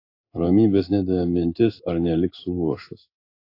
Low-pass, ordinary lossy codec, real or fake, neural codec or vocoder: 5.4 kHz; AAC, 32 kbps; fake; codec, 16 kHz in and 24 kHz out, 1 kbps, XY-Tokenizer